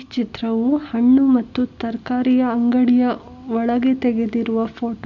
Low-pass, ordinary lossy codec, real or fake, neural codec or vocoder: 7.2 kHz; none; real; none